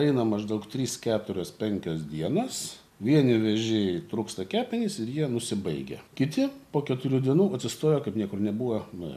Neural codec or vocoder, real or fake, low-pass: none; real; 14.4 kHz